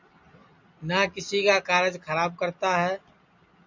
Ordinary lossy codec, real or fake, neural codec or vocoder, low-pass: MP3, 64 kbps; real; none; 7.2 kHz